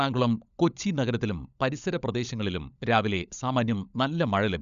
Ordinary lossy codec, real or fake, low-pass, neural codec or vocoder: none; fake; 7.2 kHz; codec, 16 kHz, 16 kbps, FunCodec, trained on LibriTTS, 50 frames a second